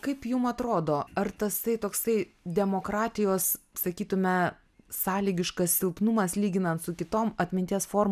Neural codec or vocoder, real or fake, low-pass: none; real; 14.4 kHz